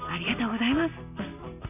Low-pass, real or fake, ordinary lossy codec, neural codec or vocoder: 3.6 kHz; real; none; none